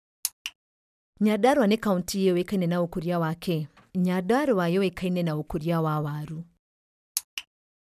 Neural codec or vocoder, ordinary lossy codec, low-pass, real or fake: none; none; 14.4 kHz; real